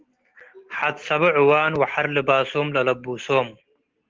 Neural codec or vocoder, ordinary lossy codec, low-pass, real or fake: none; Opus, 16 kbps; 7.2 kHz; real